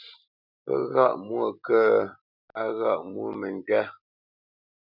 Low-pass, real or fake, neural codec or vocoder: 5.4 kHz; real; none